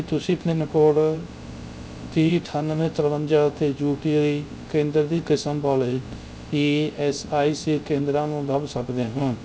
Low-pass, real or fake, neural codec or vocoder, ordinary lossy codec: none; fake; codec, 16 kHz, 0.3 kbps, FocalCodec; none